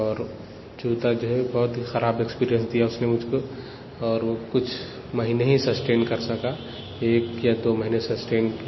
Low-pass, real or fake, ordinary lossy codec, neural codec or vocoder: 7.2 kHz; real; MP3, 24 kbps; none